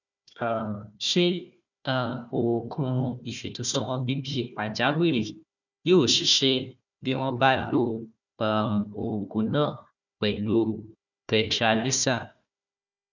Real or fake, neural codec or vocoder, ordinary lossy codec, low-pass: fake; codec, 16 kHz, 1 kbps, FunCodec, trained on Chinese and English, 50 frames a second; none; 7.2 kHz